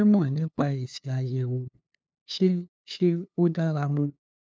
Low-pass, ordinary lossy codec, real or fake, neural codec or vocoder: none; none; fake; codec, 16 kHz, 2 kbps, FunCodec, trained on LibriTTS, 25 frames a second